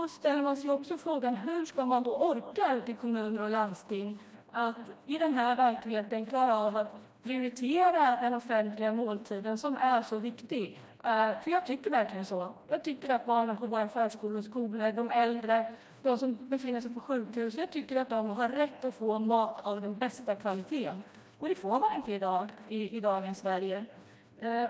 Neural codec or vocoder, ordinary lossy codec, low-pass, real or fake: codec, 16 kHz, 1 kbps, FreqCodec, smaller model; none; none; fake